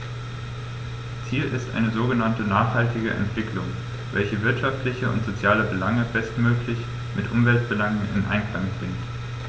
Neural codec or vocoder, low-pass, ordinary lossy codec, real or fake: none; none; none; real